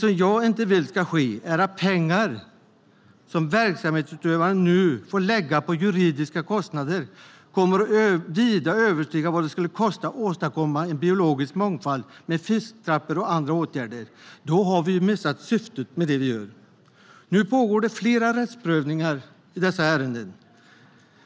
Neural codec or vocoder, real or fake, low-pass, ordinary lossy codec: none; real; none; none